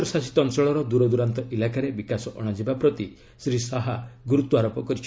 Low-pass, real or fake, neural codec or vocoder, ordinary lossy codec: none; real; none; none